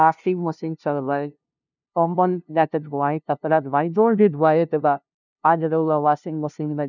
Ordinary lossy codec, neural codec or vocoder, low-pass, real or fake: none; codec, 16 kHz, 0.5 kbps, FunCodec, trained on LibriTTS, 25 frames a second; 7.2 kHz; fake